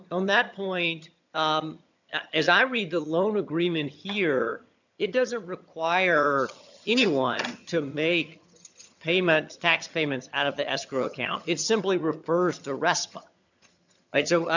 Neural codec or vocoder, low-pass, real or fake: vocoder, 22.05 kHz, 80 mel bands, HiFi-GAN; 7.2 kHz; fake